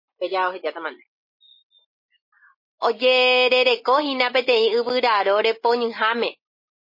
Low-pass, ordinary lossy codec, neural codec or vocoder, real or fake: 5.4 kHz; MP3, 24 kbps; none; real